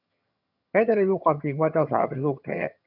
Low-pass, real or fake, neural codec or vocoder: 5.4 kHz; fake; vocoder, 22.05 kHz, 80 mel bands, HiFi-GAN